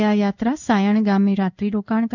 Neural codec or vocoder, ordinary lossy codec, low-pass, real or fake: codec, 16 kHz in and 24 kHz out, 1 kbps, XY-Tokenizer; none; 7.2 kHz; fake